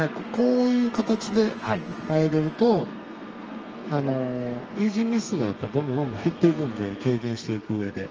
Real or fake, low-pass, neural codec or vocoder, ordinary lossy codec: fake; 7.2 kHz; codec, 32 kHz, 1.9 kbps, SNAC; Opus, 24 kbps